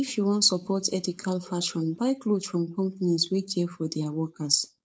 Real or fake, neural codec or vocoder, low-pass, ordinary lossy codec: fake; codec, 16 kHz, 4.8 kbps, FACodec; none; none